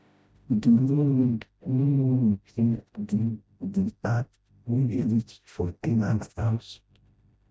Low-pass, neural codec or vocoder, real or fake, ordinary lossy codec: none; codec, 16 kHz, 0.5 kbps, FreqCodec, smaller model; fake; none